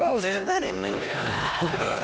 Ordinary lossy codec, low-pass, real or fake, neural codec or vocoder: none; none; fake; codec, 16 kHz, 1 kbps, X-Codec, HuBERT features, trained on LibriSpeech